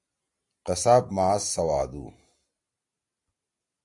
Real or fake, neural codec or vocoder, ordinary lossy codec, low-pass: real; none; AAC, 64 kbps; 10.8 kHz